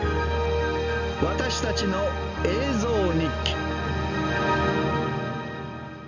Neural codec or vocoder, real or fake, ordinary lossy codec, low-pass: none; real; none; 7.2 kHz